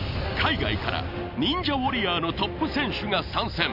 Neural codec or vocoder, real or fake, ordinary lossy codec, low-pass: none; real; none; 5.4 kHz